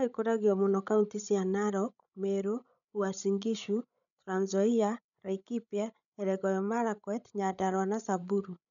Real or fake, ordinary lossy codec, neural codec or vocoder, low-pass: fake; none; codec, 16 kHz, 16 kbps, FreqCodec, larger model; 7.2 kHz